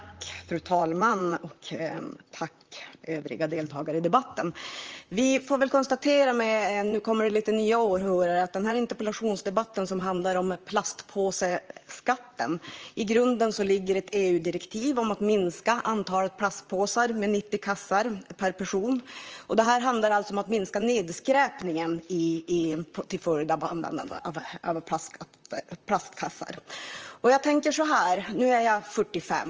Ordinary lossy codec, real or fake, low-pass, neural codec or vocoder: Opus, 24 kbps; fake; 7.2 kHz; vocoder, 44.1 kHz, 128 mel bands, Pupu-Vocoder